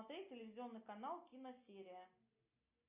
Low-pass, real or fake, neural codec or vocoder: 3.6 kHz; real; none